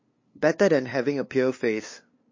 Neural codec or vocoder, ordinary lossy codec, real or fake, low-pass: codec, 16 kHz, 2 kbps, FunCodec, trained on LibriTTS, 25 frames a second; MP3, 32 kbps; fake; 7.2 kHz